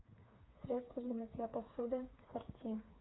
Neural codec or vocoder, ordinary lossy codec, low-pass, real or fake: codec, 16 kHz, 4 kbps, FreqCodec, smaller model; AAC, 16 kbps; 7.2 kHz; fake